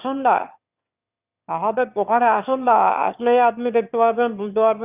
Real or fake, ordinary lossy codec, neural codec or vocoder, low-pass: fake; Opus, 64 kbps; autoencoder, 22.05 kHz, a latent of 192 numbers a frame, VITS, trained on one speaker; 3.6 kHz